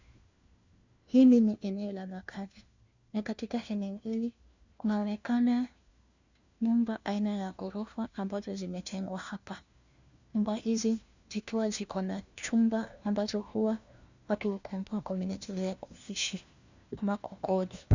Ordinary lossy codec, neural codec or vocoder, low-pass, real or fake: Opus, 64 kbps; codec, 16 kHz, 1 kbps, FunCodec, trained on LibriTTS, 50 frames a second; 7.2 kHz; fake